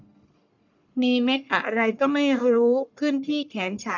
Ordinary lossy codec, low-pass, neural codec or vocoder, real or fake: none; 7.2 kHz; codec, 44.1 kHz, 1.7 kbps, Pupu-Codec; fake